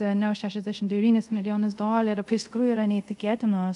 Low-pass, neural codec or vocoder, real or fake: 10.8 kHz; codec, 24 kHz, 0.5 kbps, DualCodec; fake